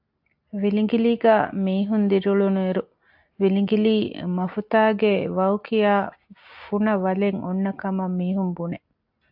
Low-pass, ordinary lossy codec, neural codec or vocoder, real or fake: 5.4 kHz; AAC, 48 kbps; none; real